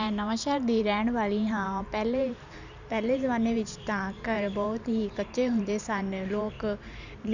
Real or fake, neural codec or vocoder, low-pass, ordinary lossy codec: fake; vocoder, 44.1 kHz, 128 mel bands every 512 samples, BigVGAN v2; 7.2 kHz; none